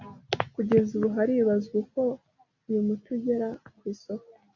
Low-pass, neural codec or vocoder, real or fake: 7.2 kHz; none; real